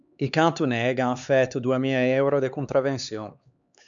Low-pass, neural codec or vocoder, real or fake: 7.2 kHz; codec, 16 kHz, 4 kbps, X-Codec, HuBERT features, trained on LibriSpeech; fake